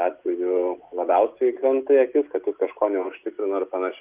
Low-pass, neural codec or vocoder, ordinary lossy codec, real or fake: 3.6 kHz; none; Opus, 24 kbps; real